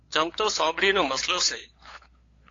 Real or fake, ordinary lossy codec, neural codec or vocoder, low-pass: fake; AAC, 32 kbps; codec, 16 kHz, 8 kbps, FunCodec, trained on LibriTTS, 25 frames a second; 7.2 kHz